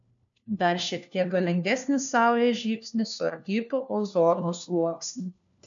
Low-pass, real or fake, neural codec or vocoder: 7.2 kHz; fake; codec, 16 kHz, 1 kbps, FunCodec, trained on LibriTTS, 50 frames a second